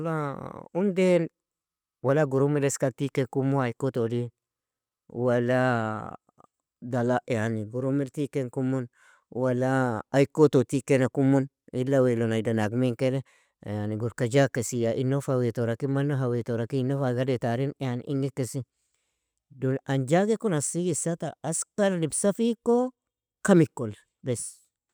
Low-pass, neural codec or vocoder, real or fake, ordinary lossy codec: none; none; real; none